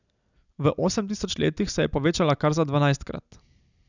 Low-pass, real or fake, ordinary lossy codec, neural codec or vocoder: 7.2 kHz; real; none; none